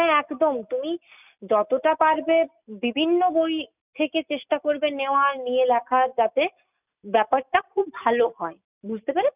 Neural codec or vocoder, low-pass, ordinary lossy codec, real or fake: none; 3.6 kHz; none; real